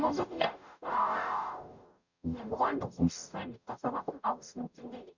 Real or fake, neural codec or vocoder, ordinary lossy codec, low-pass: fake; codec, 44.1 kHz, 0.9 kbps, DAC; none; 7.2 kHz